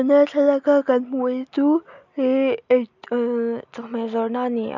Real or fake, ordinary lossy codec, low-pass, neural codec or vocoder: real; none; 7.2 kHz; none